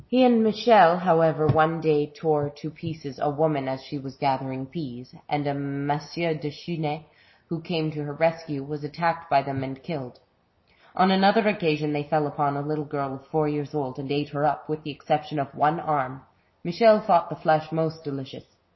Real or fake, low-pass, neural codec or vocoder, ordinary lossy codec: real; 7.2 kHz; none; MP3, 24 kbps